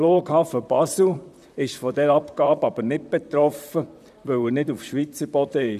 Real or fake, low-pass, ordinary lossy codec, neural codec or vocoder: fake; 14.4 kHz; none; vocoder, 44.1 kHz, 128 mel bands, Pupu-Vocoder